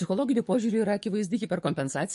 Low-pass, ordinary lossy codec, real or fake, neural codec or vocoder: 14.4 kHz; MP3, 48 kbps; fake; codec, 44.1 kHz, 7.8 kbps, DAC